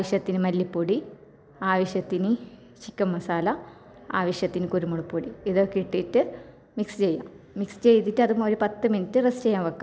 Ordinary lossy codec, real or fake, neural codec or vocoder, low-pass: none; real; none; none